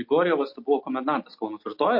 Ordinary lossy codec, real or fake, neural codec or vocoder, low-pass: MP3, 32 kbps; real; none; 5.4 kHz